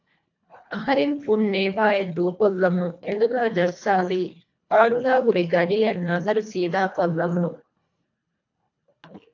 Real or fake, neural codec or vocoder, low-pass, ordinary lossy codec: fake; codec, 24 kHz, 1.5 kbps, HILCodec; 7.2 kHz; AAC, 48 kbps